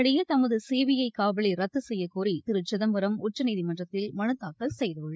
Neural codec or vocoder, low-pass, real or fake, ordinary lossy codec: codec, 16 kHz, 8 kbps, FreqCodec, larger model; none; fake; none